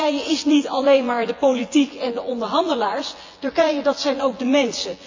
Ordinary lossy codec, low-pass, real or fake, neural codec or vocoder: AAC, 48 kbps; 7.2 kHz; fake; vocoder, 24 kHz, 100 mel bands, Vocos